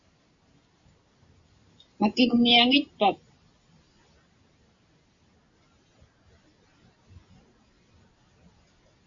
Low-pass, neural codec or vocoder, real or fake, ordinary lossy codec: 7.2 kHz; none; real; MP3, 64 kbps